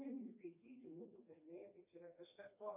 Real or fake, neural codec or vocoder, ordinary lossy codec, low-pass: fake; codec, 16 kHz, 2 kbps, FreqCodec, smaller model; MP3, 32 kbps; 3.6 kHz